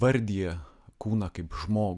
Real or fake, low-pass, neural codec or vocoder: real; 10.8 kHz; none